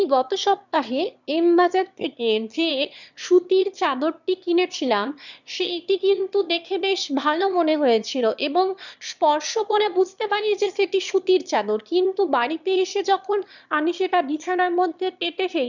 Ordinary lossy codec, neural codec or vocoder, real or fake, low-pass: none; autoencoder, 22.05 kHz, a latent of 192 numbers a frame, VITS, trained on one speaker; fake; 7.2 kHz